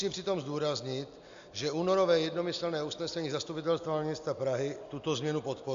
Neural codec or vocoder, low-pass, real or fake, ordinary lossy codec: none; 7.2 kHz; real; MP3, 64 kbps